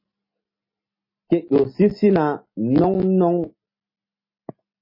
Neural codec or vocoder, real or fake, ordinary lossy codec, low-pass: none; real; MP3, 24 kbps; 5.4 kHz